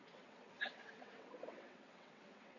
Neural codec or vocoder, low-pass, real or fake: vocoder, 22.05 kHz, 80 mel bands, WaveNeXt; 7.2 kHz; fake